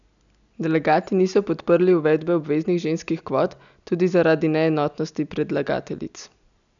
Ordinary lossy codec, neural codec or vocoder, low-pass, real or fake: none; none; 7.2 kHz; real